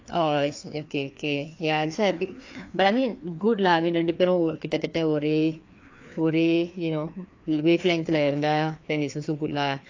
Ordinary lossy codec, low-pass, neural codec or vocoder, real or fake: AAC, 48 kbps; 7.2 kHz; codec, 16 kHz, 2 kbps, FreqCodec, larger model; fake